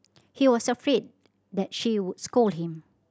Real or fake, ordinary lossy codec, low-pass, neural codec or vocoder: real; none; none; none